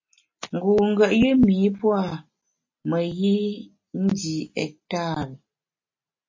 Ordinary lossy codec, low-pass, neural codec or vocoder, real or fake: MP3, 32 kbps; 7.2 kHz; none; real